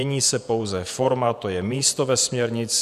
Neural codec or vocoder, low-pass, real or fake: vocoder, 48 kHz, 128 mel bands, Vocos; 14.4 kHz; fake